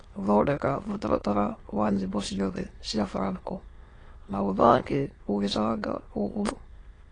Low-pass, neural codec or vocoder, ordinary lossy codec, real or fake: 9.9 kHz; autoencoder, 22.05 kHz, a latent of 192 numbers a frame, VITS, trained on many speakers; AAC, 32 kbps; fake